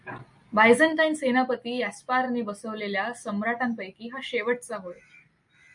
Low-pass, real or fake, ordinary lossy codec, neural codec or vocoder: 10.8 kHz; real; MP3, 48 kbps; none